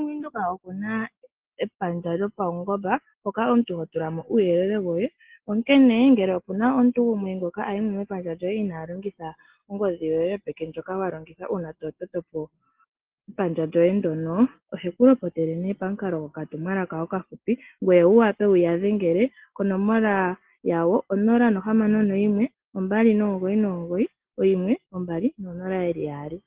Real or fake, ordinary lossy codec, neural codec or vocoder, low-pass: real; Opus, 16 kbps; none; 3.6 kHz